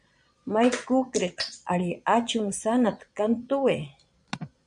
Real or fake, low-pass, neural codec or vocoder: fake; 9.9 kHz; vocoder, 22.05 kHz, 80 mel bands, Vocos